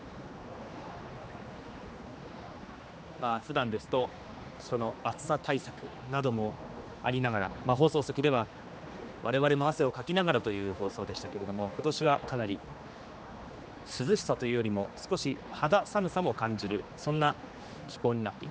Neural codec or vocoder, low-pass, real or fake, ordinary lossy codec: codec, 16 kHz, 2 kbps, X-Codec, HuBERT features, trained on general audio; none; fake; none